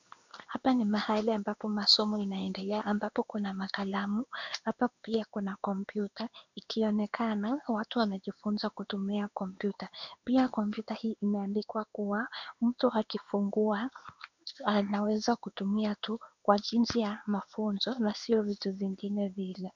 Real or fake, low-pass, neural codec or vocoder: fake; 7.2 kHz; codec, 16 kHz in and 24 kHz out, 1 kbps, XY-Tokenizer